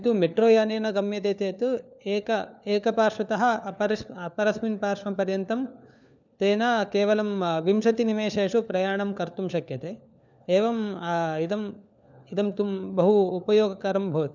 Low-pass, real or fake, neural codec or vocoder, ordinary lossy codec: 7.2 kHz; fake; codec, 16 kHz, 4 kbps, FunCodec, trained on LibriTTS, 50 frames a second; none